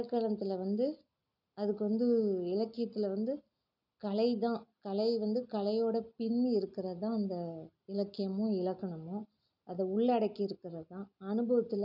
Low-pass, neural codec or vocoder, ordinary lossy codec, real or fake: 5.4 kHz; none; none; real